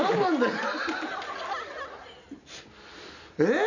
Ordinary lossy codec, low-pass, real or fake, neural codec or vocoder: none; 7.2 kHz; real; none